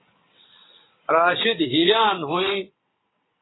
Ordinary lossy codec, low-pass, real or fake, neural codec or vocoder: AAC, 16 kbps; 7.2 kHz; fake; vocoder, 22.05 kHz, 80 mel bands, WaveNeXt